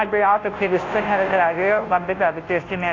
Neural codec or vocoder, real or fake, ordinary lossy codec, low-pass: codec, 16 kHz, 0.5 kbps, FunCodec, trained on Chinese and English, 25 frames a second; fake; AAC, 32 kbps; 7.2 kHz